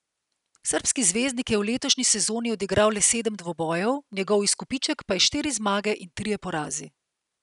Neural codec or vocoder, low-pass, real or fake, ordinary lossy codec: none; 10.8 kHz; real; none